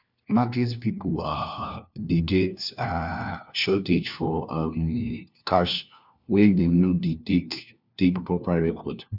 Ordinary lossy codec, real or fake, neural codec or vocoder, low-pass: none; fake; codec, 16 kHz, 1 kbps, FunCodec, trained on LibriTTS, 50 frames a second; 5.4 kHz